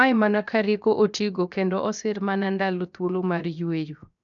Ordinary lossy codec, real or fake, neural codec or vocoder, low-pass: none; fake; codec, 16 kHz, 0.7 kbps, FocalCodec; 7.2 kHz